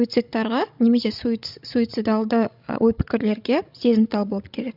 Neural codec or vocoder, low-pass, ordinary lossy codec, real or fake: codec, 16 kHz, 16 kbps, FreqCodec, larger model; 5.4 kHz; none; fake